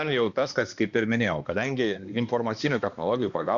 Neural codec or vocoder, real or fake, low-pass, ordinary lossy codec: codec, 16 kHz, 2 kbps, X-Codec, HuBERT features, trained on balanced general audio; fake; 7.2 kHz; Opus, 64 kbps